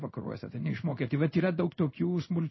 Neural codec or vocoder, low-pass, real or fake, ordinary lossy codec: codec, 16 kHz in and 24 kHz out, 1 kbps, XY-Tokenizer; 7.2 kHz; fake; MP3, 24 kbps